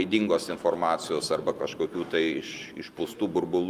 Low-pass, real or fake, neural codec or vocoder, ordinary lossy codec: 14.4 kHz; real; none; Opus, 32 kbps